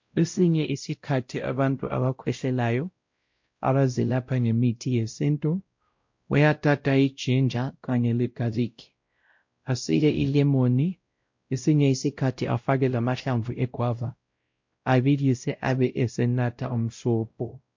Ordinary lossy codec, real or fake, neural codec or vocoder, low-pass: MP3, 48 kbps; fake; codec, 16 kHz, 0.5 kbps, X-Codec, WavLM features, trained on Multilingual LibriSpeech; 7.2 kHz